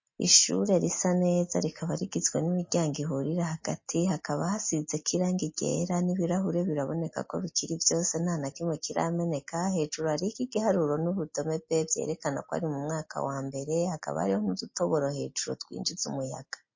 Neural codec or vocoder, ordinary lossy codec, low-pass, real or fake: none; MP3, 32 kbps; 7.2 kHz; real